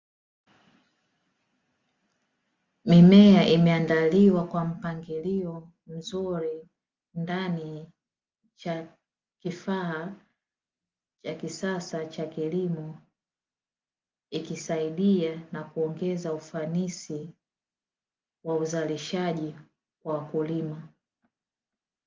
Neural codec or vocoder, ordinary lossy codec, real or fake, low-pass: none; Opus, 32 kbps; real; 7.2 kHz